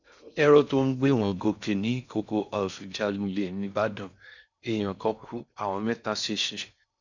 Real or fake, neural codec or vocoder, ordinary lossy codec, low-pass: fake; codec, 16 kHz in and 24 kHz out, 0.6 kbps, FocalCodec, streaming, 4096 codes; none; 7.2 kHz